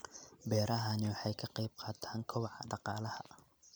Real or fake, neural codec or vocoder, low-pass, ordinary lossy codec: fake; vocoder, 44.1 kHz, 128 mel bands every 256 samples, BigVGAN v2; none; none